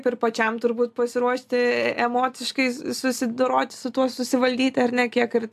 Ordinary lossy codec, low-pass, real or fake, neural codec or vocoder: AAC, 96 kbps; 14.4 kHz; real; none